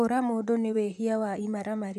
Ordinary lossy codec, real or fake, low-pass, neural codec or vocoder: none; fake; 14.4 kHz; vocoder, 44.1 kHz, 128 mel bands, Pupu-Vocoder